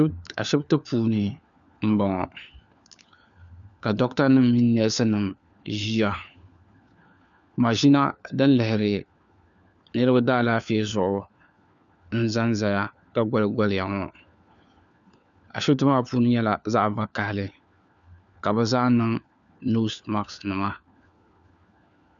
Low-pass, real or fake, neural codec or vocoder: 7.2 kHz; fake; codec, 16 kHz, 4 kbps, FunCodec, trained on LibriTTS, 50 frames a second